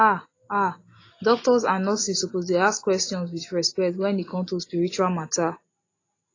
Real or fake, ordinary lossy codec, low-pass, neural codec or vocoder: real; AAC, 32 kbps; 7.2 kHz; none